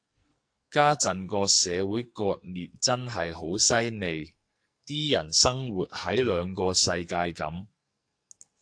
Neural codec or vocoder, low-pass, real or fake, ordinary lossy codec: codec, 44.1 kHz, 2.6 kbps, SNAC; 9.9 kHz; fake; AAC, 64 kbps